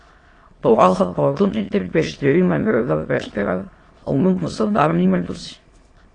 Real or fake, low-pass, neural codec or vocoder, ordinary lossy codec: fake; 9.9 kHz; autoencoder, 22.05 kHz, a latent of 192 numbers a frame, VITS, trained on many speakers; AAC, 32 kbps